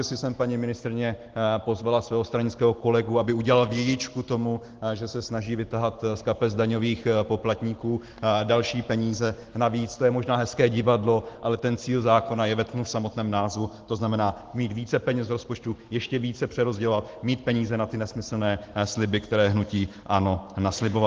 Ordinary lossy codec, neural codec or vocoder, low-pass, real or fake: Opus, 16 kbps; none; 7.2 kHz; real